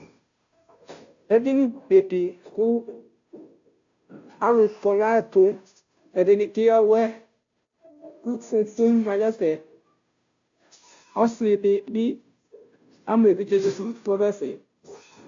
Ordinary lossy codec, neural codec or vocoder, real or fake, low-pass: AAC, 64 kbps; codec, 16 kHz, 0.5 kbps, FunCodec, trained on Chinese and English, 25 frames a second; fake; 7.2 kHz